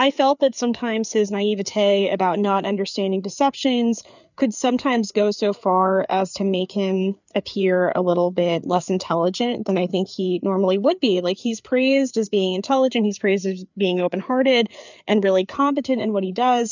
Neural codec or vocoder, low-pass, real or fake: codec, 16 kHz, 4 kbps, FreqCodec, larger model; 7.2 kHz; fake